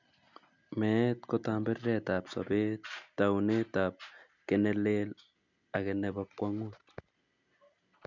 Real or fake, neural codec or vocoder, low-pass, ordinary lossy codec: real; none; 7.2 kHz; none